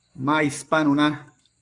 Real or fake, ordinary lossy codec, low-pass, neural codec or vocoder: fake; Opus, 64 kbps; 10.8 kHz; codec, 44.1 kHz, 7.8 kbps, Pupu-Codec